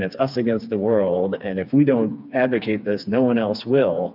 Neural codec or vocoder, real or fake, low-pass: codec, 16 kHz, 4 kbps, FreqCodec, smaller model; fake; 5.4 kHz